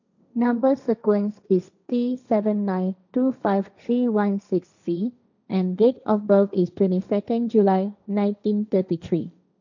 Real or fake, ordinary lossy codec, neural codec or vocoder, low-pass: fake; none; codec, 16 kHz, 1.1 kbps, Voila-Tokenizer; 7.2 kHz